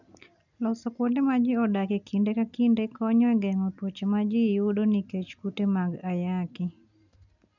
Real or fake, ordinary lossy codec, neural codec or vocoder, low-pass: real; none; none; 7.2 kHz